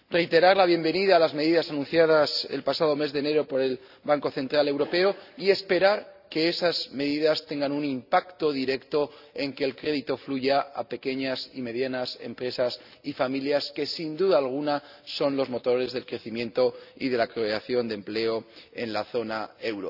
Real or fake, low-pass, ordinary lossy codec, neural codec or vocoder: real; 5.4 kHz; none; none